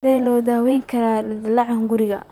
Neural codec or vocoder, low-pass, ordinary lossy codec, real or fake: vocoder, 44.1 kHz, 128 mel bands, Pupu-Vocoder; 19.8 kHz; none; fake